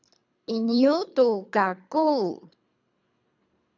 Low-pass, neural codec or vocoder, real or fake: 7.2 kHz; codec, 24 kHz, 3 kbps, HILCodec; fake